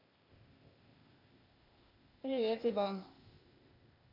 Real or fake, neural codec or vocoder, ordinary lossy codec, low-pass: fake; codec, 16 kHz, 0.8 kbps, ZipCodec; MP3, 48 kbps; 5.4 kHz